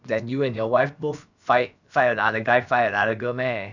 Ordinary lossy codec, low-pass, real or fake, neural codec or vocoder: none; 7.2 kHz; fake; codec, 16 kHz, about 1 kbps, DyCAST, with the encoder's durations